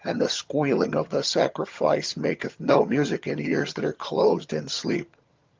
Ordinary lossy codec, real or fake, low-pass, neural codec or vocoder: Opus, 24 kbps; fake; 7.2 kHz; vocoder, 22.05 kHz, 80 mel bands, HiFi-GAN